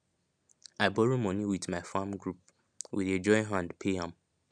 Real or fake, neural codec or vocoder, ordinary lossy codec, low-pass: real; none; none; 9.9 kHz